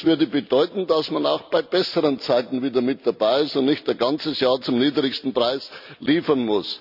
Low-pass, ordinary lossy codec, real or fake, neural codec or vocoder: 5.4 kHz; none; real; none